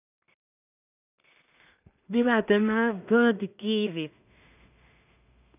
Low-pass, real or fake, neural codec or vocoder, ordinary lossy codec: 3.6 kHz; fake; codec, 16 kHz in and 24 kHz out, 0.4 kbps, LongCat-Audio-Codec, two codebook decoder; none